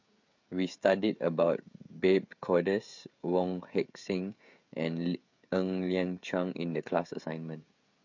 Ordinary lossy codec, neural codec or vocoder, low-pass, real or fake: MP3, 48 kbps; codec, 16 kHz, 16 kbps, FreqCodec, smaller model; 7.2 kHz; fake